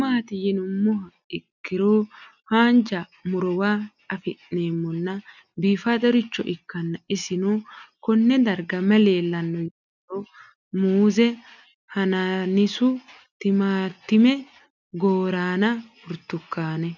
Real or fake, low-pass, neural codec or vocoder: real; 7.2 kHz; none